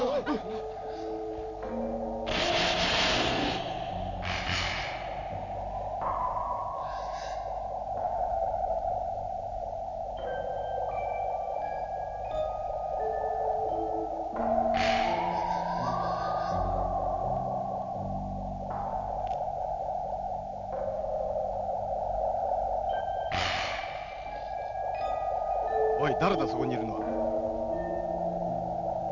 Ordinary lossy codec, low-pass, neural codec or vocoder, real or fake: none; 7.2 kHz; none; real